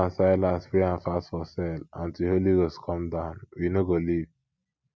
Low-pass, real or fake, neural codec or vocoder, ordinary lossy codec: none; real; none; none